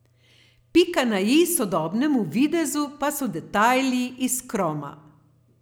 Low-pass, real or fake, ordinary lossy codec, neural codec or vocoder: none; real; none; none